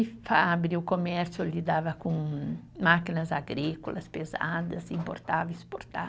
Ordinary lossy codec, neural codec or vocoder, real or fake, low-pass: none; none; real; none